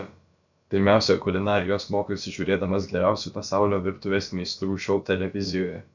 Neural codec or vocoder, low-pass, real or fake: codec, 16 kHz, about 1 kbps, DyCAST, with the encoder's durations; 7.2 kHz; fake